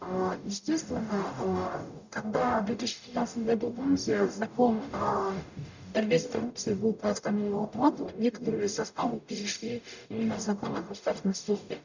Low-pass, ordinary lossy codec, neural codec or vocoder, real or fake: 7.2 kHz; none; codec, 44.1 kHz, 0.9 kbps, DAC; fake